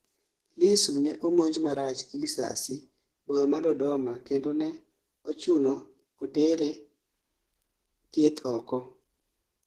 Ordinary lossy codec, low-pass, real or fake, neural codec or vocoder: Opus, 16 kbps; 14.4 kHz; fake; codec, 32 kHz, 1.9 kbps, SNAC